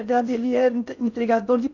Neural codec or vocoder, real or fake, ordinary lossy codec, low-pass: codec, 16 kHz in and 24 kHz out, 0.6 kbps, FocalCodec, streaming, 4096 codes; fake; none; 7.2 kHz